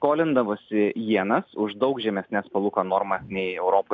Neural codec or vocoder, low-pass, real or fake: none; 7.2 kHz; real